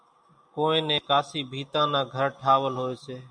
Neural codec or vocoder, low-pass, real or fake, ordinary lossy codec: none; 9.9 kHz; real; MP3, 64 kbps